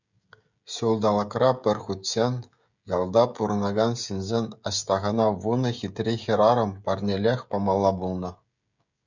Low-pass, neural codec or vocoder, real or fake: 7.2 kHz; codec, 16 kHz, 16 kbps, FreqCodec, smaller model; fake